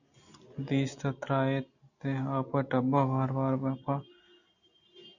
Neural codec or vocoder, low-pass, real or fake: none; 7.2 kHz; real